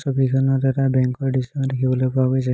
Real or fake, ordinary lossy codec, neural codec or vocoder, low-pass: real; none; none; none